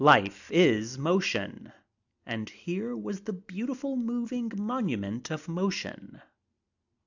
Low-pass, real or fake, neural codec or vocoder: 7.2 kHz; real; none